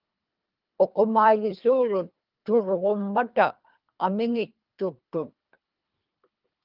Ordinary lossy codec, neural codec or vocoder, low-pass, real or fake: Opus, 24 kbps; codec, 24 kHz, 3 kbps, HILCodec; 5.4 kHz; fake